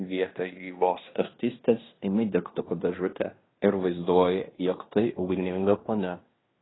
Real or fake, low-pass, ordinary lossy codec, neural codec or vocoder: fake; 7.2 kHz; AAC, 16 kbps; codec, 16 kHz in and 24 kHz out, 0.9 kbps, LongCat-Audio-Codec, fine tuned four codebook decoder